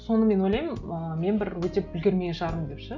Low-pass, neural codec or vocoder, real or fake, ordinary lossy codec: 7.2 kHz; none; real; none